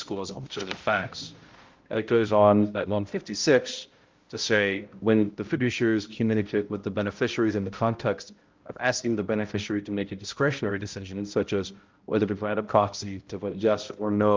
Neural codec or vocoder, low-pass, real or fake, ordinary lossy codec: codec, 16 kHz, 0.5 kbps, X-Codec, HuBERT features, trained on balanced general audio; 7.2 kHz; fake; Opus, 32 kbps